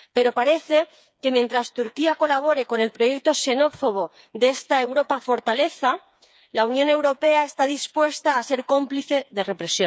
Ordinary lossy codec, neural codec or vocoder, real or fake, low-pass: none; codec, 16 kHz, 4 kbps, FreqCodec, smaller model; fake; none